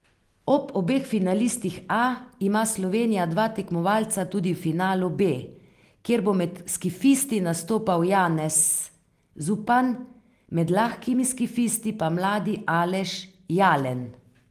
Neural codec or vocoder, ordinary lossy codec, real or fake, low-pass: none; Opus, 24 kbps; real; 14.4 kHz